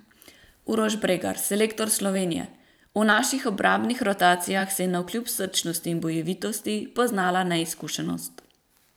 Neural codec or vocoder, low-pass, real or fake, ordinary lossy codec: vocoder, 44.1 kHz, 128 mel bands every 512 samples, BigVGAN v2; none; fake; none